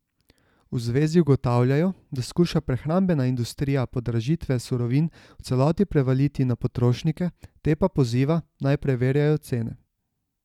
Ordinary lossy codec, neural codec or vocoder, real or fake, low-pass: none; none; real; 19.8 kHz